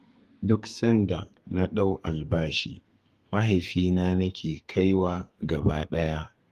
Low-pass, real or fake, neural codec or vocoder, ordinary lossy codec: 14.4 kHz; fake; codec, 32 kHz, 1.9 kbps, SNAC; Opus, 32 kbps